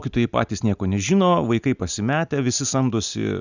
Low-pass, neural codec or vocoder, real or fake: 7.2 kHz; none; real